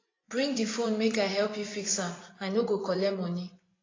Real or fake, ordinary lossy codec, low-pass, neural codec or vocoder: fake; AAC, 32 kbps; 7.2 kHz; vocoder, 24 kHz, 100 mel bands, Vocos